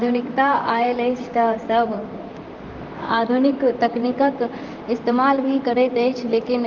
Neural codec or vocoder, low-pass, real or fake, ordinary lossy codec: vocoder, 44.1 kHz, 128 mel bands, Pupu-Vocoder; 7.2 kHz; fake; Opus, 24 kbps